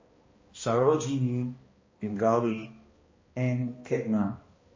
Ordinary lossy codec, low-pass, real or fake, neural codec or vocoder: MP3, 32 kbps; 7.2 kHz; fake; codec, 16 kHz, 1 kbps, X-Codec, HuBERT features, trained on balanced general audio